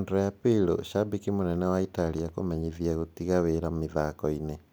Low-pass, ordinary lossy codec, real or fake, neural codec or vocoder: none; none; real; none